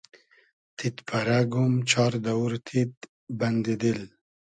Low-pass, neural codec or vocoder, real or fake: 9.9 kHz; none; real